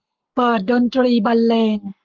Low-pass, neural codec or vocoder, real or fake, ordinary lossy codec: 7.2 kHz; codec, 44.1 kHz, 7.8 kbps, Pupu-Codec; fake; Opus, 24 kbps